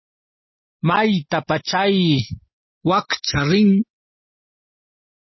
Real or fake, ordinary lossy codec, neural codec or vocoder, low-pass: real; MP3, 24 kbps; none; 7.2 kHz